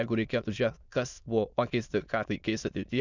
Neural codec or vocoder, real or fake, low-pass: autoencoder, 22.05 kHz, a latent of 192 numbers a frame, VITS, trained on many speakers; fake; 7.2 kHz